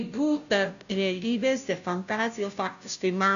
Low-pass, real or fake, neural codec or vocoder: 7.2 kHz; fake; codec, 16 kHz, 0.5 kbps, FunCodec, trained on Chinese and English, 25 frames a second